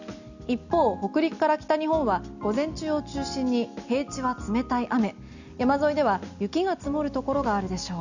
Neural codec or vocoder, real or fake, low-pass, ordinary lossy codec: none; real; 7.2 kHz; none